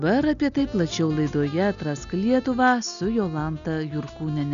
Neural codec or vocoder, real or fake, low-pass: none; real; 7.2 kHz